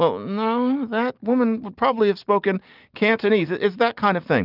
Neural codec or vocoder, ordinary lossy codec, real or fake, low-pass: none; Opus, 24 kbps; real; 5.4 kHz